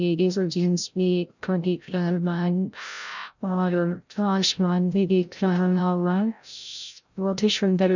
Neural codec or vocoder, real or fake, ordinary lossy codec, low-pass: codec, 16 kHz, 0.5 kbps, FreqCodec, larger model; fake; none; 7.2 kHz